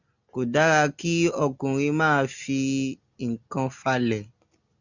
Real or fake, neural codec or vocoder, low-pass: real; none; 7.2 kHz